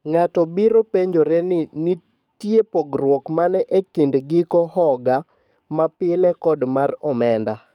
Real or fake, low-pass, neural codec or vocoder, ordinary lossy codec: fake; 19.8 kHz; codec, 44.1 kHz, 7.8 kbps, DAC; none